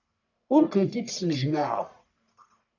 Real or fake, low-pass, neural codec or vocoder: fake; 7.2 kHz; codec, 44.1 kHz, 1.7 kbps, Pupu-Codec